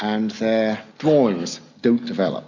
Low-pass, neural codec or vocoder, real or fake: 7.2 kHz; none; real